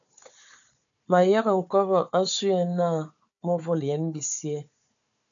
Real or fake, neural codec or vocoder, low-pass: fake; codec, 16 kHz, 4 kbps, FunCodec, trained on Chinese and English, 50 frames a second; 7.2 kHz